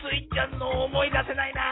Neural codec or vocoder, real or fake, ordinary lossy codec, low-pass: none; real; AAC, 16 kbps; 7.2 kHz